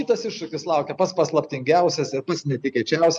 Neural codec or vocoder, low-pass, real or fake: none; 9.9 kHz; real